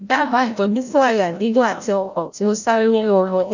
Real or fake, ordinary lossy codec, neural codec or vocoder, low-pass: fake; none; codec, 16 kHz, 0.5 kbps, FreqCodec, larger model; 7.2 kHz